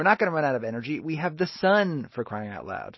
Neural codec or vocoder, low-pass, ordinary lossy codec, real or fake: none; 7.2 kHz; MP3, 24 kbps; real